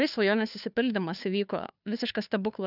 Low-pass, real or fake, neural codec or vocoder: 5.4 kHz; fake; codec, 16 kHz, 2 kbps, FunCodec, trained on Chinese and English, 25 frames a second